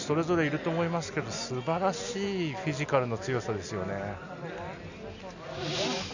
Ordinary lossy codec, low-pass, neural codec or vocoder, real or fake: none; 7.2 kHz; none; real